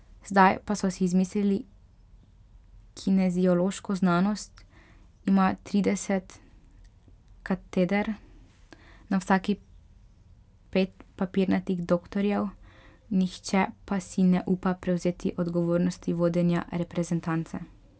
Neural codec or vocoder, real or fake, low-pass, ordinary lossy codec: none; real; none; none